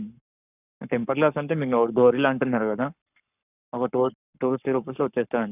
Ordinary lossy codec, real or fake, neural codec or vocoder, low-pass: none; fake; codec, 44.1 kHz, 7.8 kbps, Pupu-Codec; 3.6 kHz